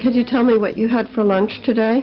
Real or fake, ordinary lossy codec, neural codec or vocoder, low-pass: real; Opus, 16 kbps; none; 7.2 kHz